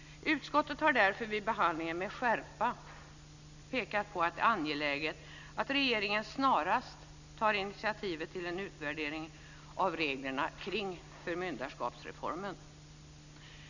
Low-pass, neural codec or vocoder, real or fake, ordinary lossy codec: 7.2 kHz; none; real; none